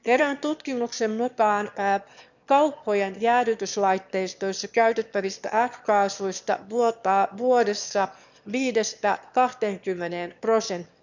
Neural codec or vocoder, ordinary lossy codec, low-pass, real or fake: autoencoder, 22.05 kHz, a latent of 192 numbers a frame, VITS, trained on one speaker; none; 7.2 kHz; fake